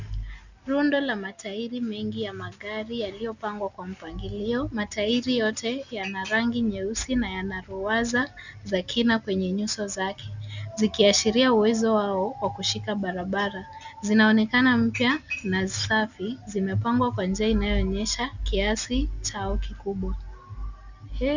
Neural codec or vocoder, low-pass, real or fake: none; 7.2 kHz; real